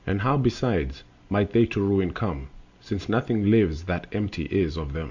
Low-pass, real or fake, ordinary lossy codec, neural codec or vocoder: 7.2 kHz; real; Opus, 64 kbps; none